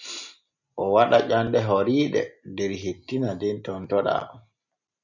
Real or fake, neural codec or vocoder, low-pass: real; none; 7.2 kHz